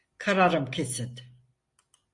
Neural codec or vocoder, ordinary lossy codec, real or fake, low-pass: none; MP3, 48 kbps; real; 10.8 kHz